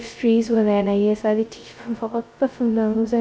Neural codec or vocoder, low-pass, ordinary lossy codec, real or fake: codec, 16 kHz, 0.2 kbps, FocalCodec; none; none; fake